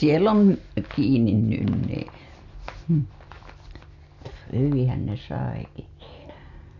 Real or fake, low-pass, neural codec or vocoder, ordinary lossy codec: real; 7.2 kHz; none; none